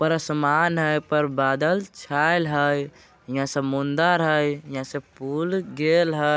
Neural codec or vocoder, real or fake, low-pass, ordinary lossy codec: none; real; none; none